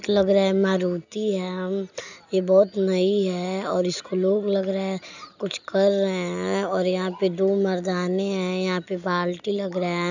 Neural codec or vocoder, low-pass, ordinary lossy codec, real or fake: none; 7.2 kHz; none; real